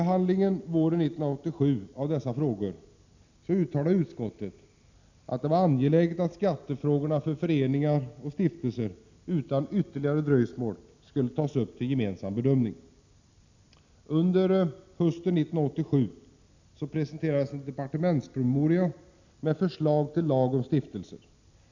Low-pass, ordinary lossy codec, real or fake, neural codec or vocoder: 7.2 kHz; none; real; none